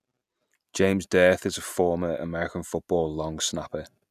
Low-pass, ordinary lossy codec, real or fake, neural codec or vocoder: 14.4 kHz; none; real; none